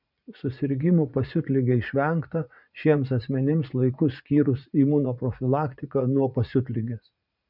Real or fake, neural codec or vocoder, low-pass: fake; vocoder, 44.1 kHz, 80 mel bands, Vocos; 5.4 kHz